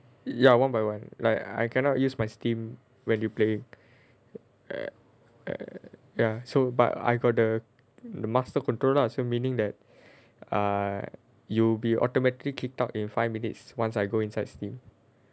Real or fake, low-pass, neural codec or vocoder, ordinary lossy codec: real; none; none; none